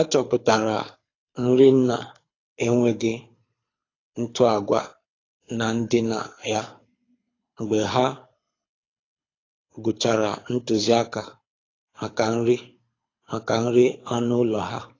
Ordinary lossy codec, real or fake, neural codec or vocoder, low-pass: AAC, 32 kbps; fake; codec, 24 kHz, 6 kbps, HILCodec; 7.2 kHz